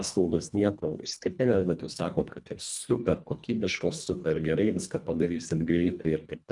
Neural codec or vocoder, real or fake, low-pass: codec, 24 kHz, 1.5 kbps, HILCodec; fake; 10.8 kHz